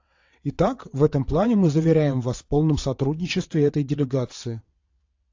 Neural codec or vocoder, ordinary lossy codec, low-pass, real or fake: vocoder, 22.05 kHz, 80 mel bands, WaveNeXt; AAC, 48 kbps; 7.2 kHz; fake